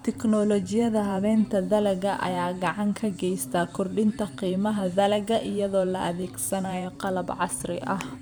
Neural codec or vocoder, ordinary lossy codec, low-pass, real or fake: vocoder, 44.1 kHz, 128 mel bands every 512 samples, BigVGAN v2; none; none; fake